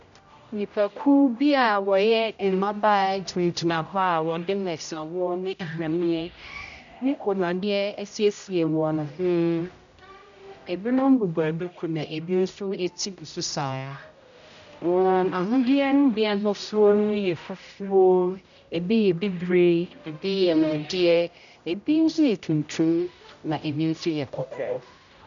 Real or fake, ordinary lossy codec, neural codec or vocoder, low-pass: fake; MP3, 96 kbps; codec, 16 kHz, 0.5 kbps, X-Codec, HuBERT features, trained on general audio; 7.2 kHz